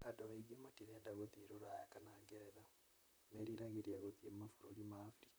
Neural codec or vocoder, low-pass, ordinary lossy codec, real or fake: vocoder, 44.1 kHz, 128 mel bands, Pupu-Vocoder; none; none; fake